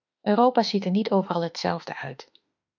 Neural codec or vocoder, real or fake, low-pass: autoencoder, 48 kHz, 32 numbers a frame, DAC-VAE, trained on Japanese speech; fake; 7.2 kHz